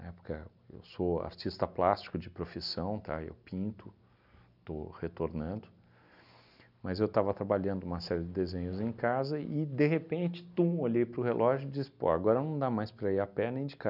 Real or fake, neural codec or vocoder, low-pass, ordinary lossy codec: real; none; 5.4 kHz; none